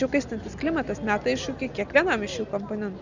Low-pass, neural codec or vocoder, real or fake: 7.2 kHz; none; real